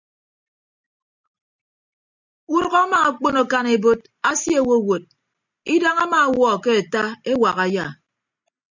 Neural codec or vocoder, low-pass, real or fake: none; 7.2 kHz; real